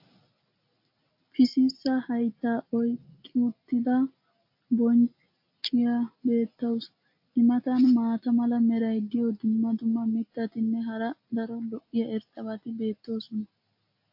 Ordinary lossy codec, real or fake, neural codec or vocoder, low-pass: AAC, 48 kbps; real; none; 5.4 kHz